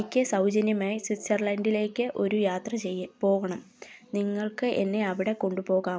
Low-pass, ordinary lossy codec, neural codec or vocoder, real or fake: none; none; none; real